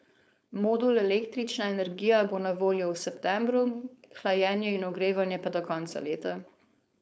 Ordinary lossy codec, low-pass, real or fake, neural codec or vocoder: none; none; fake; codec, 16 kHz, 4.8 kbps, FACodec